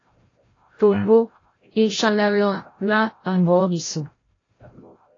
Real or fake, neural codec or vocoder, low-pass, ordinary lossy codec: fake; codec, 16 kHz, 0.5 kbps, FreqCodec, larger model; 7.2 kHz; AAC, 32 kbps